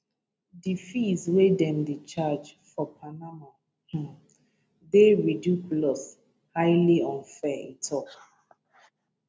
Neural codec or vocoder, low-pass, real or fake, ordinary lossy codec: none; none; real; none